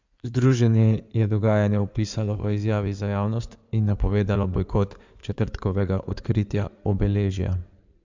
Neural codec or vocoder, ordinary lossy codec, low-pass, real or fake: codec, 16 kHz in and 24 kHz out, 2.2 kbps, FireRedTTS-2 codec; MP3, 64 kbps; 7.2 kHz; fake